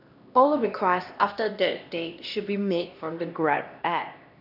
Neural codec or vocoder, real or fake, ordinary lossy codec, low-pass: codec, 16 kHz, 1 kbps, X-Codec, HuBERT features, trained on LibriSpeech; fake; none; 5.4 kHz